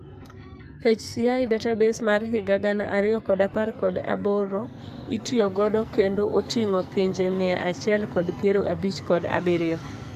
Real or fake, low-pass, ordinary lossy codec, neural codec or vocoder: fake; 14.4 kHz; AAC, 96 kbps; codec, 44.1 kHz, 2.6 kbps, SNAC